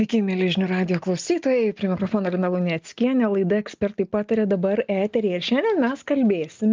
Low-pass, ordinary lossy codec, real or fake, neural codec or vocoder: 7.2 kHz; Opus, 32 kbps; real; none